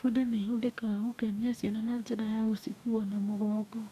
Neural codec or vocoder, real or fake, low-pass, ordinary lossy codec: codec, 44.1 kHz, 2.6 kbps, DAC; fake; 14.4 kHz; none